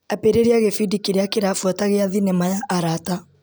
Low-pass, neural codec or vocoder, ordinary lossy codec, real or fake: none; none; none; real